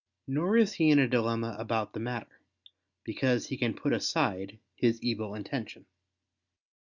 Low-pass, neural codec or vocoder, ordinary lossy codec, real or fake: 7.2 kHz; none; Opus, 64 kbps; real